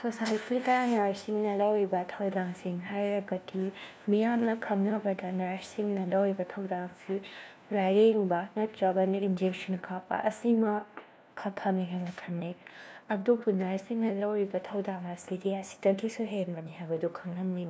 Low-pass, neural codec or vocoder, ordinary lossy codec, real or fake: none; codec, 16 kHz, 1 kbps, FunCodec, trained on LibriTTS, 50 frames a second; none; fake